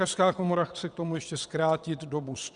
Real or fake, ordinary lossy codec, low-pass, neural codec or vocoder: fake; Opus, 64 kbps; 9.9 kHz; vocoder, 22.05 kHz, 80 mel bands, WaveNeXt